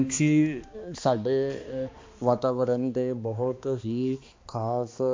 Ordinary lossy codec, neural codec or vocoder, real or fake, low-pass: MP3, 48 kbps; codec, 16 kHz, 2 kbps, X-Codec, HuBERT features, trained on balanced general audio; fake; 7.2 kHz